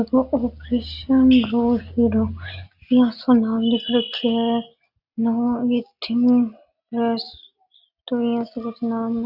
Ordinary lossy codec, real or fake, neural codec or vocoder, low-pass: Opus, 64 kbps; real; none; 5.4 kHz